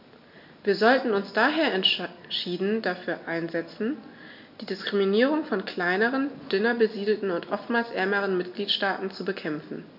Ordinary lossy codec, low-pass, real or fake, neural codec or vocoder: none; 5.4 kHz; real; none